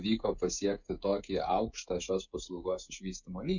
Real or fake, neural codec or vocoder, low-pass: fake; codec, 16 kHz, 16 kbps, FreqCodec, smaller model; 7.2 kHz